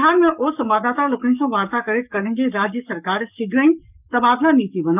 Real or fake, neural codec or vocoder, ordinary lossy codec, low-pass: fake; codec, 44.1 kHz, 7.8 kbps, Pupu-Codec; none; 3.6 kHz